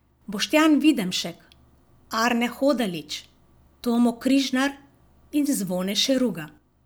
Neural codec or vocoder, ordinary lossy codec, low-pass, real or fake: none; none; none; real